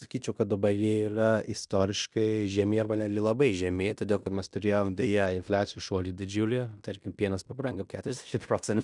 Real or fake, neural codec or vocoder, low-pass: fake; codec, 16 kHz in and 24 kHz out, 0.9 kbps, LongCat-Audio-Codec, fine tuned four codebook decoder; 10.8 kHz